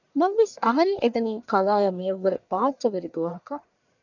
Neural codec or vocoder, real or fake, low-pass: codec, 44.1 kHz, 1.7 kbps, Pupu-Codec; fake; 7.2 kHz